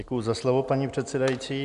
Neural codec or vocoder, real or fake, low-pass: none; real; 10.8 kHz